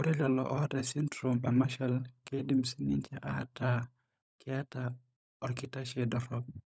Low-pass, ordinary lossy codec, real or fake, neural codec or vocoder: none; none; fake; codec, 16 kHz, 16 kbps, FunCodec, trained on LibriTTS, 50 frames a second